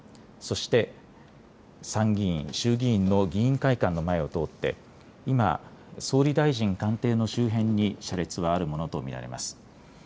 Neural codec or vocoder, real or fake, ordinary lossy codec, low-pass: none; real; none; none